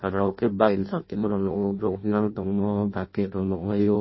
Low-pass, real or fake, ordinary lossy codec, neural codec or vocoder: 7.2 kHz; fake; MP3, 24 kbps; codec, 16 kHz in and 24 kHz out, 0.6 kbps, FireRedTTS-2 codec